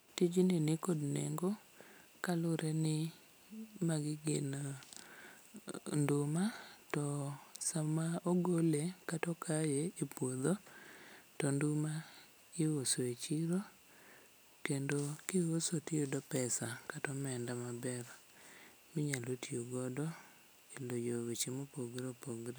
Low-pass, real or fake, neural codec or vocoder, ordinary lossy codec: none; real; none; none